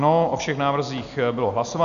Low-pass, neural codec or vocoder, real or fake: 7.2 kHz; none; real